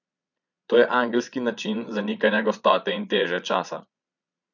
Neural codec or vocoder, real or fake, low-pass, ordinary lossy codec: vocoder, 22.05 kHz, 80 mel bands, Vocos; fake; 7.2 kHz; none